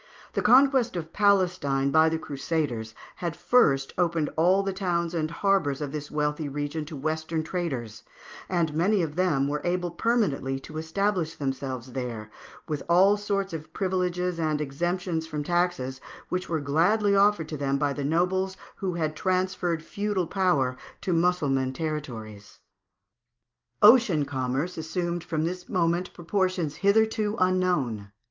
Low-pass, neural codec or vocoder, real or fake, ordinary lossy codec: 7.2 kHz; none; real; Opus, 24 kbps